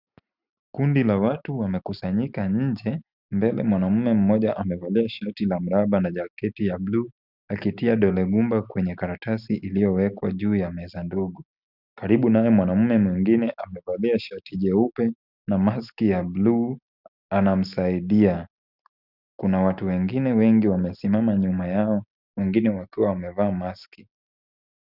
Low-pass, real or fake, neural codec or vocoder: 5.4 kHz; real; none